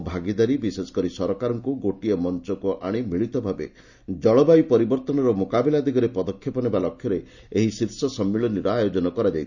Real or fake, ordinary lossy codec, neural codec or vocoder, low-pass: real; none; none; 7.2 kHz